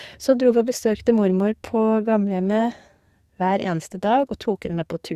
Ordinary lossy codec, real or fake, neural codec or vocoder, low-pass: Opus, 64 kbps; fake; codec, 44.1 kHz, 2.6 kbps, SNAC; 14.4 kHz